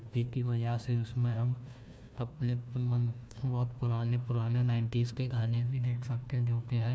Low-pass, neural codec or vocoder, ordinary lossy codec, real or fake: none; codec, 16 kHz, 1 kbps, FunCodec, trained on Chinese and English, 50 frames a second; none; fake